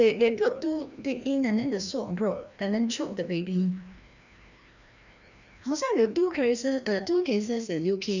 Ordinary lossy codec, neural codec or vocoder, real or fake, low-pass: none; codec, 16 kHz, 1 kbps, FreqCodec, larger model; fake; 7.2 kHz